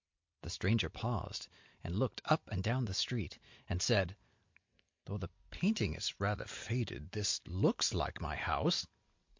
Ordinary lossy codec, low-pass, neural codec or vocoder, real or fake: MP3, 48 kbps; 7.2 kHz; none; real